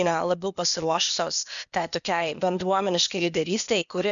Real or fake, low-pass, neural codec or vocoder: fake; 7.2 kHz; codec, 16 kHz, 0.8 kbps, ZipCodec